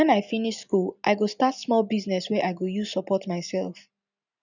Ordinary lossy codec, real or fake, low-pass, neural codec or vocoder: none; real; 7.2 kHz; none